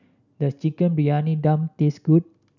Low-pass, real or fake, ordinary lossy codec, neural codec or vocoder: 7.2 kHz; real; none; none